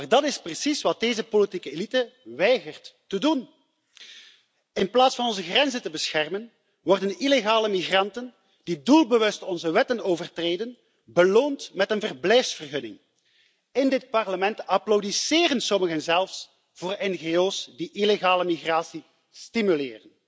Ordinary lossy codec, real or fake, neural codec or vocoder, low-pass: none; real; none; none